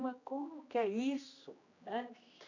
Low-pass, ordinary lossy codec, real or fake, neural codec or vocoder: 7.2 kHz; AAC, 48 kbps; fake; codec, 16 kHz, 1 kbps, X-Codec, HuBERT features, trained on general audio